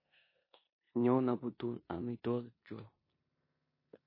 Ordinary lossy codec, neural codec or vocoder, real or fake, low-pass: MP3, 24 kbps; codec, 16 kHz in and 24 kHz out, 0.9 kbps, LongCat-Audio-Codec, four codebook decoder; fake; 5.4 kHz